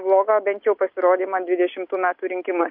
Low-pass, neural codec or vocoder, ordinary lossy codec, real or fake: 5.4 kHz; none; MP3, 48 kbps; real